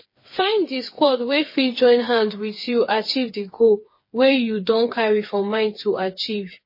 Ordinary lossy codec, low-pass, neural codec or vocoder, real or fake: MP3, 24 kbps; 5.4 kHz; codec, 16 kHz, 4 kbps, FreqCodec, smaller model; fake